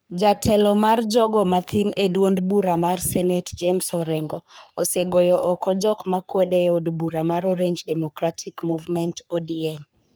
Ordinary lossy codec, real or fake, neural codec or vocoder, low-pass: none; fake; codec, 44.1 kHz, 3.4 kbps, Pupu-Codec; none